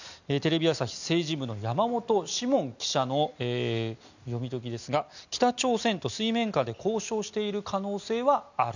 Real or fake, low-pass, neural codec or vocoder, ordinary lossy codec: real; 7.2 kHz; none; none